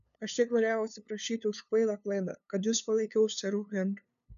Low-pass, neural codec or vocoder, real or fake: 7.2 kHz; codec, 16 kHz, 2 kbps, FunCodec, trained on LibriTTS, 25 frames a second; fake